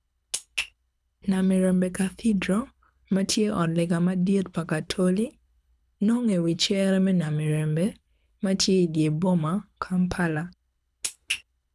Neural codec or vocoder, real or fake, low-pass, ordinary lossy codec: codec, 24 kHz, 6 kbps, HILCodec; fake; none; none